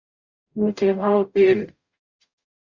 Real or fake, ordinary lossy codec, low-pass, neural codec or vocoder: fake; Opus, 64 kbps; 7.2 kHz; codec, 44.1 kHz, 0.9 kbps, DAC